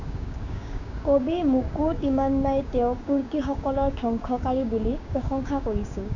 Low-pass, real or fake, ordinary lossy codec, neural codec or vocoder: 7.2 kHz; fake; none; codec, 16 kHz, 6 kbps, DAC